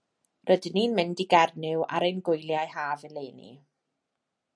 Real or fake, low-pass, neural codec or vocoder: real; 9.9 kHz; none